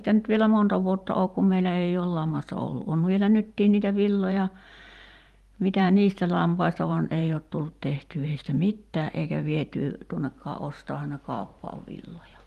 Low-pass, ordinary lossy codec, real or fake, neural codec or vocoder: 14.4 kHz; Opus, 32 kbps; fake; vocoder, 44.1 kHz, 128 mel bands every 512 samples, BigVGAN v2